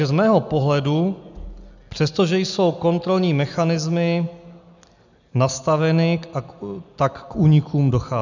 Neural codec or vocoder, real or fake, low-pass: none; real; 7.2 kHz